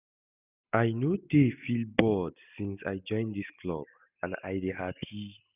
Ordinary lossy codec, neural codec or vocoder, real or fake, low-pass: none; none; real; 3.6 kHz